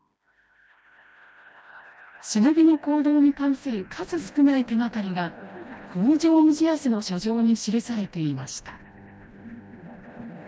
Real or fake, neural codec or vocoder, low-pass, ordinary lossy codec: fake; codec, 16 kHz, 1 kbps, FreqCodec, smaller model; none; none